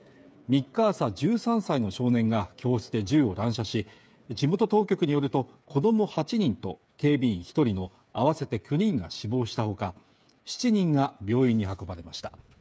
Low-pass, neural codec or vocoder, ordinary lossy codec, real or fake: none; codec, 16 kHz, 8 kbps, FreqCodec, smaller model; none; fake